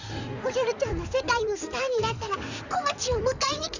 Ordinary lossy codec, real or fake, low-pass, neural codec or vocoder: none; fake; 7.2 kHz; codec, 16 kHz in and 24 kHz out, 2.2 kbps, FireRedTTS-2 codec